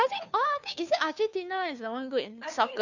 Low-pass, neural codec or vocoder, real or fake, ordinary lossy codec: 7.2 kHz; codec, 16 kHz, 2 kbps, FunCodec, trained on Chinese and English, 25 frames a second; fake; none